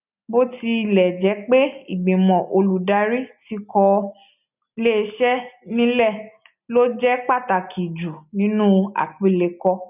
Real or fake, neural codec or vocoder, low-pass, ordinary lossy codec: real; none; 3.6 kHz; none